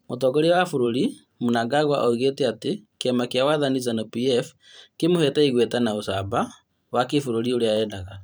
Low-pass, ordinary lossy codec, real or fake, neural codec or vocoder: none; none; fake; vocoder, 44.1 kHz, 128 mel bands every 512 samples, BigVGAN v2